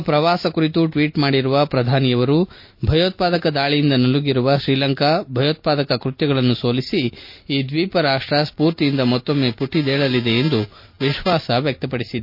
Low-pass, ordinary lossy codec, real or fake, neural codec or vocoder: 5.4 kHz; MP3, 32 kbps; real; none